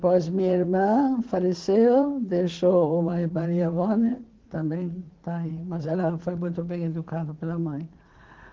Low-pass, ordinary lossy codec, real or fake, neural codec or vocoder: 7.2 kHz; Opus, 16 kbps; fake; vocoder, 44.1 kHz, 80 mel bands, Vocos